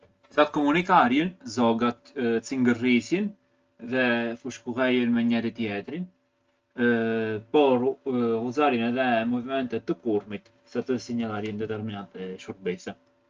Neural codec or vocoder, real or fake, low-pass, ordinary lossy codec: none; real; 7.2 kHz; Opus, 24 kbps